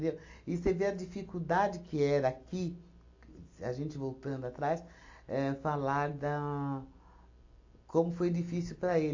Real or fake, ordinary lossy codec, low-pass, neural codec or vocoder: real; AAC, 48 kbps; 7.2 kHz; none